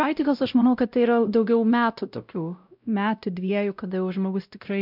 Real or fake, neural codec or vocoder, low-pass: fake; codec, 16 kHz, 0.5 kbps, X-Codec, WavLM features, trained on Multilingual LibriSpeech; 5.4 kHz